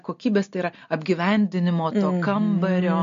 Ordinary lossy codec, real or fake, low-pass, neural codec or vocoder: MP3, 48 kbps; real; 7.2 kHz; none